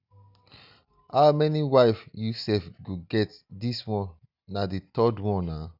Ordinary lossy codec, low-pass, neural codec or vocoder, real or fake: none; 5.4 kHz; none; real